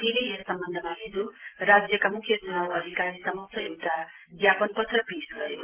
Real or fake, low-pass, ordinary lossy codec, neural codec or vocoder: real; 3.6 kHz; Opus, 16 kbps; none